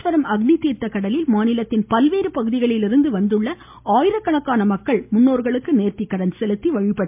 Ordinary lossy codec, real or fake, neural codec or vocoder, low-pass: MP3, 32 kbps; real; none; 3.6 kHz